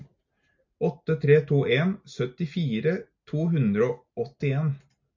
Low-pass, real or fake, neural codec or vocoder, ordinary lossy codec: 7.2 kHz; real; none; MP3, 64 kbps